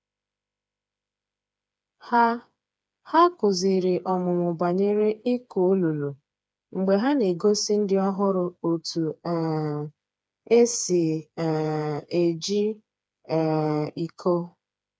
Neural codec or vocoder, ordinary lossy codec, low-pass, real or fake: codec, 16 kHz, 4 kbps, FreqCodec, smaller model; none; none; fake